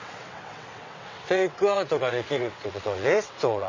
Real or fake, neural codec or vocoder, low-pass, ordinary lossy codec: fake; vocoder, 22.05 kHz, 80 mel bands, WaveNeXt; 7.2 kHz; MP3, 32 kbps